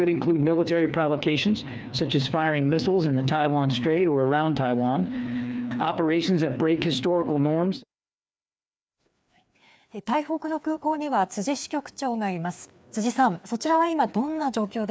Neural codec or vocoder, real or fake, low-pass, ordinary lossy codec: codec, 16 kHz, 2 kbps, FreqCodec, larger model; fake; none; none